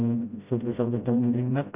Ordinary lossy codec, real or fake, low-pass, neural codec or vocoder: none; fake; 3.6 kHz; codec, 16 kHz, 0.5 kbps, FreqCodec, smaller model